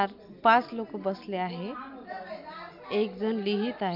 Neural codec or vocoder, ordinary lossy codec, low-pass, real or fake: none; MP3, 48 kbps; 5.4 kHz; real